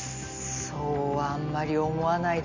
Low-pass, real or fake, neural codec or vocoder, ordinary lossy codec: 7.2 kHz; real; none; MP3, 48 kbps